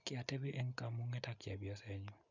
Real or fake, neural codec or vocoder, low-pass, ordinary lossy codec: real; none; 7.2 kHz; none